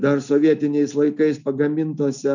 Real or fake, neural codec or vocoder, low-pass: real; none; 7.2 kHz